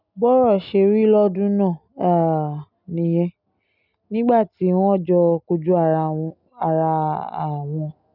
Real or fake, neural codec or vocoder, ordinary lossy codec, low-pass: real; none; none; 5.4 kHz